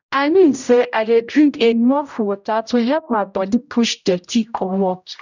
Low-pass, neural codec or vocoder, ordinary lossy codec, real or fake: 7.2 kHz; codec, 16 kHz, 0.5 kbps, X-Codec, HuBERT features, trained on general audio; none; fake